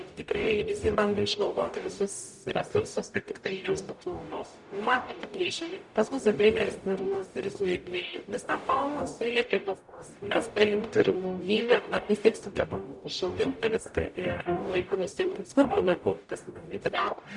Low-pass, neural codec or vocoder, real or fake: 10.8 kHz; codec, 44.1 kHz, 0.9 kbps, DAC; fake